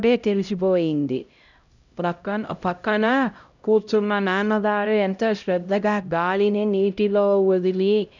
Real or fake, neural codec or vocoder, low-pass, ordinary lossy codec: fake; codec, 16 kHz, 0.5 kbps, X-Codec, HuBERT features, trained on LibriSpeech; 7.2 kHz; none